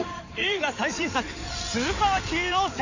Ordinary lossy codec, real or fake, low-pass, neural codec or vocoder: none; fake; 7.2 kHz; codec, 16 kHz in and 24 kHz out, 2.2 kbps, FireRedTTS-2 codec